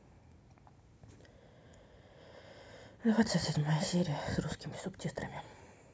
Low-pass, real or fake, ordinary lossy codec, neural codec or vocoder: none; real; none; none